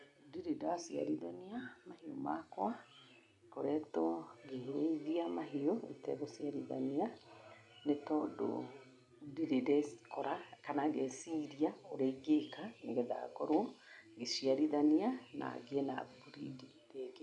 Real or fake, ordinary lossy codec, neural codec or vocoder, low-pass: real; none; none; 10.8 kHz